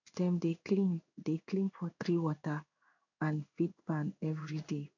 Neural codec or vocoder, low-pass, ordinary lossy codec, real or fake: codec, 16 kHz in and 24 kHz out, 1 kbps, XY-Tokenizer; 7.2 kHz; none; fake